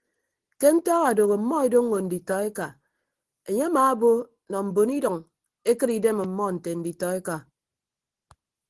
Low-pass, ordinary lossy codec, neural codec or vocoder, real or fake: 10.8 kHz; Opus, 16 kbps; none; real